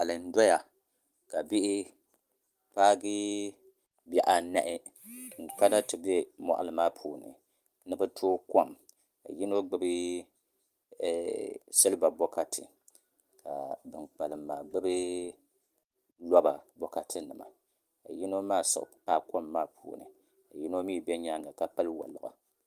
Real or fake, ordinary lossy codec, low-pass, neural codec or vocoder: real; Opus, 32 kbps; 14.4 kHz; none